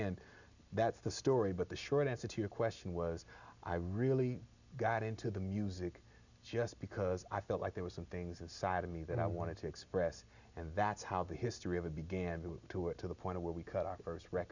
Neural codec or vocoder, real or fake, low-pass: none; real; 7.2 kHz